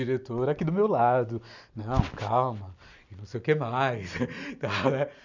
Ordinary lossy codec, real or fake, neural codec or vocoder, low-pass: none; real; none; 7.2 kHz